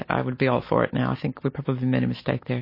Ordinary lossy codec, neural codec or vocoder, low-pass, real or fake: MP3, 24 kbps; none; 5.4 kHz; real